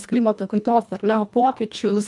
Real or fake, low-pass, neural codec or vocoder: fake; 10.8 kHz; codec, 24 kHz, 1.5 kbps, HILCodec